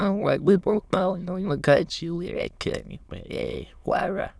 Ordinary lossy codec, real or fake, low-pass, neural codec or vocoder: none; fake; none; autoencoder, 22.05 kHz, a latent of 192 numbers a frame, VITS, trained on many speakers